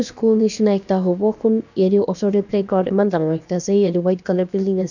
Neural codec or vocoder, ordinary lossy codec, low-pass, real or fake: codec, 16 kHz, about 1 kbps, DyCAST, with the encoder's durations; none; 7.2 kHz; fake